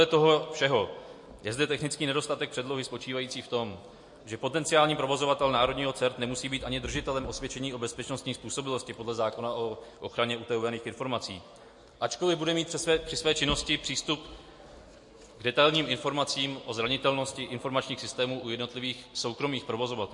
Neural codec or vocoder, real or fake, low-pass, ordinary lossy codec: none; real; 10.8 kHz; MP3, 48 kbps